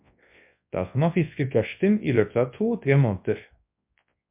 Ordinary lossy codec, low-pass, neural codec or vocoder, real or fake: MP3, 32 kbps; 3.6 kHz; codec, 24 kHz, 0.9 kbps, WavTokenizer, large speech release; fake